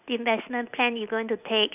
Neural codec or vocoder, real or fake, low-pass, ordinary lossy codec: none; real; 3.6 kHz; none